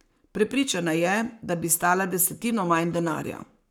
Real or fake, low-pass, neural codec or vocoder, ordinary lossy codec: fake; none; codec, 44.1 kHz, 7.8 kbps, Pupu-Codec; none